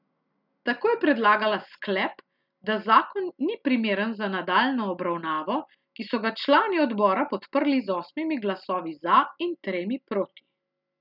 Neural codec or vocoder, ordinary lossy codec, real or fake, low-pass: none; none; real; 5.4 kHz